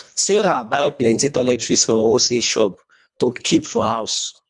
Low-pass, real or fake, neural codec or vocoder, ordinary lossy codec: 10.8 kHz; fake; codec, 24 kHz, 1.5 kbps, HILCodec; none